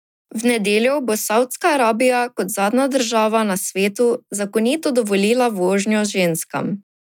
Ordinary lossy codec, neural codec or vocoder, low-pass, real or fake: none; none; 19.8 kHz; real